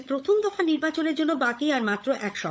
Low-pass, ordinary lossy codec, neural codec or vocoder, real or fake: none; none; codec, 16 kHz, 16 kbps, FunCodec, trained on LibriTTS, 50 frames a second; fake